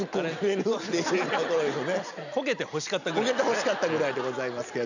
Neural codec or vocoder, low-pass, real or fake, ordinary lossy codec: none; 7.2 kHz; real; none